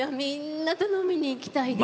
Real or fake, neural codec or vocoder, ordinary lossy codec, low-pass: real; none; none; none